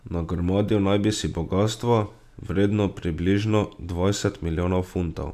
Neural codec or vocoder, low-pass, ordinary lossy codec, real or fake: none; 14.4 kHz; none; real